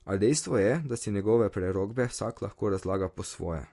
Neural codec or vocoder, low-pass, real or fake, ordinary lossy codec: none; 14.4 kHz; real; MP3, 48 kbps